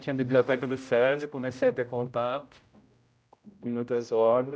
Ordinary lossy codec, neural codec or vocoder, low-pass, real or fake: none; codec, 16 kHz, 0.5 kbps, X-Codec, HuBERT features, trained on general audio; none; fake